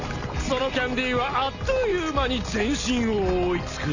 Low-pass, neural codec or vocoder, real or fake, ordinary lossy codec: 7.2 kHz; none; real; none